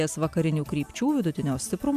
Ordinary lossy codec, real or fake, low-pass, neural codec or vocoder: AAC, 96 kbps; real; 14.4 kHz; none